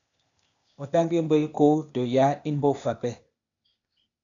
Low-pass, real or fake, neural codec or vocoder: 7.2 kHz; fake; codec, 16 kHz, 0.8 kbps, ZipCodec